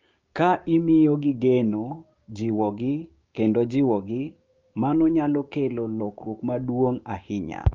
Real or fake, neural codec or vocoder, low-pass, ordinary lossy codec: fake; codec, 16 kHz, 6 kbps, DAC; 7.2 kHz; Opus, 24 kbps